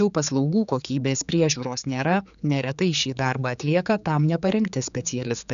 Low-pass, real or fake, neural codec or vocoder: 7.2 kHz; fake; codec, 16 kHz, 4 kbps, X-Codec, HuBERT features, trained on general audio